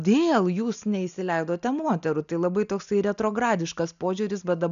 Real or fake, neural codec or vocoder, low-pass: real; none; 7.2 kHz